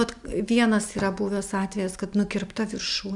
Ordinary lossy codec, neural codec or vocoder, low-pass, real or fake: MP3, 96 kbps; none; 10.8 kHz; real